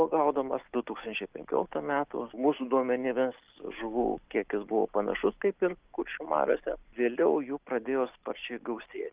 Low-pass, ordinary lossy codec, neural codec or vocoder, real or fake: 3.6 kHz; Opus, 32 kbps; none; real